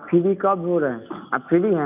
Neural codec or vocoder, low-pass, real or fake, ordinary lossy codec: none; 3.6 kHz; real; none